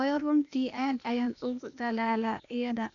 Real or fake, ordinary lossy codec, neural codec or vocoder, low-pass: fake; none; codec, 16 kHz, 0.8 kbps, ZipCodec; 7.2 kHz